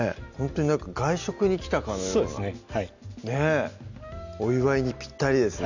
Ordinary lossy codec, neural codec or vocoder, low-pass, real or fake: none; none; 7.2 kHz; real